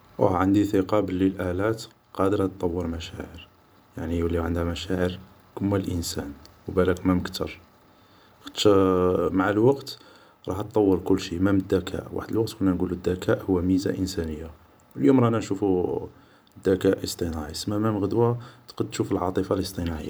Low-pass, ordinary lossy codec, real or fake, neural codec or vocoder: none; none; fake; vocoder, 44.1 kHz, 128 mel bands every 512 samples, BigVGAN v2